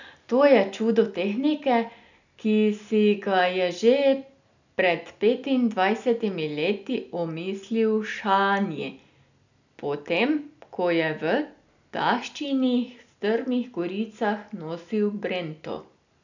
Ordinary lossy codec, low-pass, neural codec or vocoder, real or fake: none; 7.2 kHz; none; real